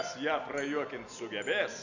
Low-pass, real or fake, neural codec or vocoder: 7.2 kHz; real; none